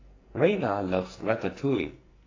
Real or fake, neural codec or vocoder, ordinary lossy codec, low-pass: fake; codec, 44.1 kHz, 2.6 kbps, SNAC; AAC, 32 kbps; 7.2 kHz